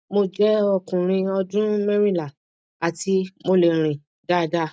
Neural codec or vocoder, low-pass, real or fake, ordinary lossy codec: none; none; real; none